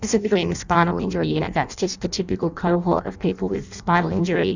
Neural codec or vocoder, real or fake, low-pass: codec, 16 kHz in and 24 kHz out, 0.6 kbps, FireRedTTS-2 codec; fake; 7.2 kHz